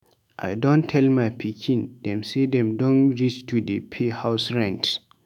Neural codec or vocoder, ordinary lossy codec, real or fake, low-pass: autoencoder, 48 kHz, 128 numbers a frame, DAC-VAE, trained on Japanese speech; none; fake; 19.8 kHz